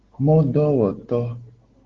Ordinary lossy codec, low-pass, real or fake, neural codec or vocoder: Opus, 16 kbps; 7.2 kHz; real; none